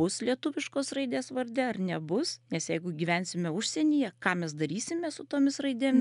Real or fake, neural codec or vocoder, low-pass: real; none; 10.8 kHz